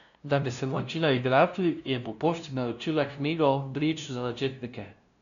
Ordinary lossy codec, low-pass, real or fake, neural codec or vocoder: none; 7.2 kHz; fake; codec, 16 kHz, 0.5 kbps, FunCodec, trained on LibriTTS, 25 frames a second